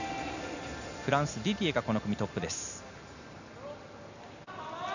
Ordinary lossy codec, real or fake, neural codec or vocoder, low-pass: none; real; none; 7.2 kHz